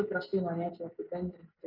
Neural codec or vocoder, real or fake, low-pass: none; real; 5.4 kHz